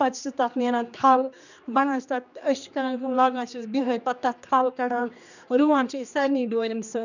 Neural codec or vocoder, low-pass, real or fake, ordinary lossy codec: codec, 16 kHz, 2 kbps, X-Codec, HuBERT features, trained on general audio; 7.2 kHz; fake; none